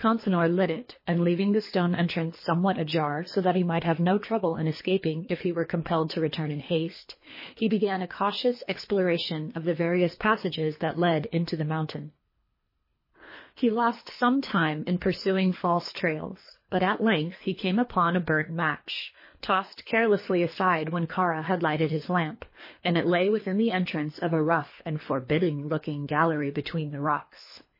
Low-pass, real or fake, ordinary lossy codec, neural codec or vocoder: 5.4 kHz; fake; MP3, 24 kbps; codec, 24 kHz, 3 kbps, HILCodec